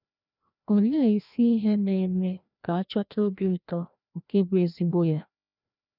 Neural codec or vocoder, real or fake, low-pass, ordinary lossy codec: codec, 16 kHz, 1 kbps, FreqCodec, larger model; fake; 5.4 kHz; none